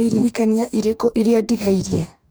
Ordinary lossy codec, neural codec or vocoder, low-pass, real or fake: none; codec, 44.1 kHz, 2.6 kbps, DAC; none; fake